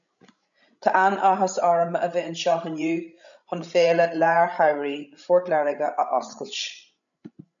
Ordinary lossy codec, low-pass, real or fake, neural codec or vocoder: MP3, 96 kbps; 7.2 kHz; fake; codec, 16 kHz, 16 kbps, FreqCodec, larger model